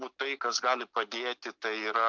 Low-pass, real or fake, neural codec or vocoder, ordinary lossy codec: 7.2 kHz; real; none; MP3, 64 kbps